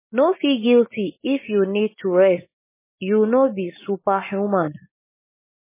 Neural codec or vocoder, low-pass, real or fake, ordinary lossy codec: none; 3.6 kHz; real; MP3, 16 kbps